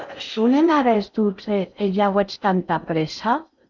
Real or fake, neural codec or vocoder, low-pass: fake; codec, 16 kHz in and 24 kHz out, 0.6 kbps, FocalCodec, streaming, 4096 codes; 7.2 kHz